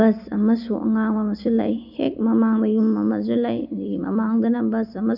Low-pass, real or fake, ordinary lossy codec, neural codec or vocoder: 5.4 kHz; real; none; none